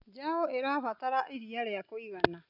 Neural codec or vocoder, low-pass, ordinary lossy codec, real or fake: none; 5.4 kHz; none; real